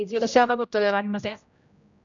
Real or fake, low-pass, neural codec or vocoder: fake; 7.2 kHz; codec, 16 kHz, 0.5 kbps, X-Codec, HuBERT features, trained on general audio